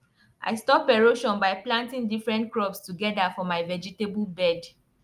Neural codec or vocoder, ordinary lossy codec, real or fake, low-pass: none; Opus, 32 kbps; real; 14.4 kHz